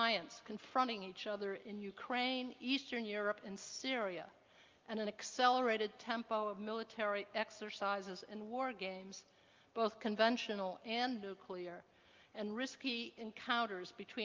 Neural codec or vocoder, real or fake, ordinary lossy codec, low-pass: none; real; Opus, 24 kbps; 7.2 kHz